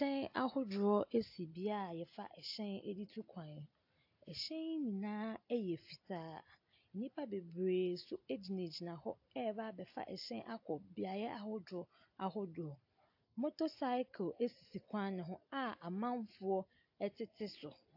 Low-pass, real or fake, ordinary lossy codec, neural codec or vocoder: 5.4 kHz; real; AAC, 48 kbps; none